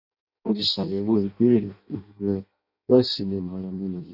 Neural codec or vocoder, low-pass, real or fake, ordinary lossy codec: codec, 16 kHz in and 24 kHz out, 0.6 kbps, FireRedTTS-2 codec; 5.4 kHz; fake; none